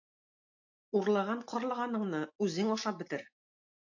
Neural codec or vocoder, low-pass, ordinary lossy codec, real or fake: none; 7.2 kHz; AAC, 48 kbps; real